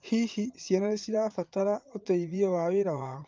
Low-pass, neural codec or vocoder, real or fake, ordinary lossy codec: 7.2 kHz; vocoder, 44.1 kHz, 80 mel bands, Vocos; fake; Opus, 24 kbps